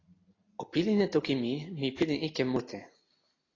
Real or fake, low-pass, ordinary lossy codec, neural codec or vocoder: real; 7.2 kHz; AAC, 32 kbps; none